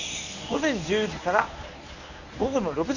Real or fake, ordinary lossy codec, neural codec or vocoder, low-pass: fake; none; codec, 24 kHz, 0.9 kbps, WavTokenizer, medium speech release version 1; 7.2 kHz